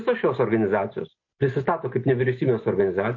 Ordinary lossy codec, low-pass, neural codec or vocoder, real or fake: MP3, 32 kbps; 7.2 kHz; none; real